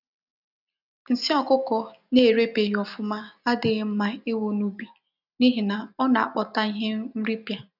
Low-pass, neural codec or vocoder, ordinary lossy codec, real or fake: 5.4 kHz; none; none; real